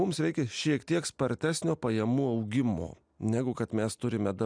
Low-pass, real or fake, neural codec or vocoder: 9.9 kHz; real; none